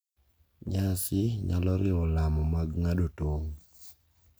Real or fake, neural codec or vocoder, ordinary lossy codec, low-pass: real; none; none; none